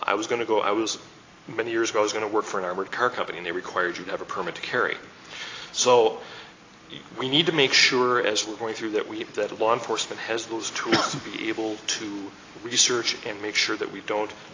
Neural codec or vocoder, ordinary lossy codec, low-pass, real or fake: none; AAC, 32 kbps; 7.2 kHz; real